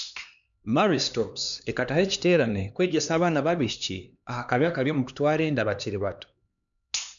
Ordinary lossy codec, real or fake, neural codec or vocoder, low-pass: none; fake; codec, 16 kHz, 2 kbps, X-Codec, HuBERT features, trained on LibriSpeech; 7.2 kHz